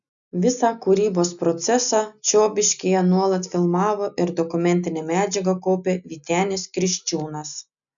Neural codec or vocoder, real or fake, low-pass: none; real; 7.2 kHz